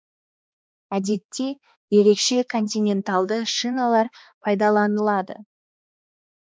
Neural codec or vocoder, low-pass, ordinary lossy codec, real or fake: codec, 16 kHz, 2 kbps, X-Codec, HuBERT features, trained on balanced general audio; none; none; fake